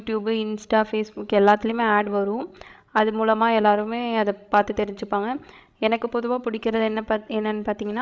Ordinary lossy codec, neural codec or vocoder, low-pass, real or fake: none; codec, 16 kHz, 16 kbps, FreqCodec, larger model; none; fake